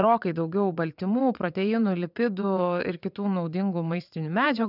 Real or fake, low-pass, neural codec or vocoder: fake; 5.4 kHz; vocoder, 22.05 kHz, 80 mel bands, WaveNeXt